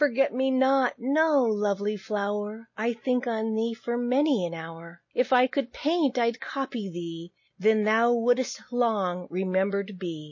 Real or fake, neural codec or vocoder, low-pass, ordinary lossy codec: real; none; 7.2 kHz; MP3, 32 kbps